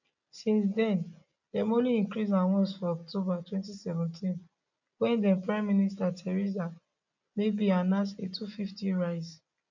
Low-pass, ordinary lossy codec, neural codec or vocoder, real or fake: 7.2 kHz; none; none; real